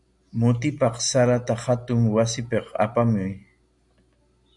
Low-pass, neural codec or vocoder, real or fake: 10.8 kHz; none; real